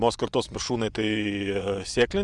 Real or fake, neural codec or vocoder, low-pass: fake; vocoder, 24 kHz, 100 mel bands, Vocos; 10.8 kHz